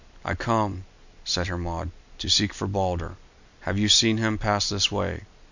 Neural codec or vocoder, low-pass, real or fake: none; 7.2 kHz; real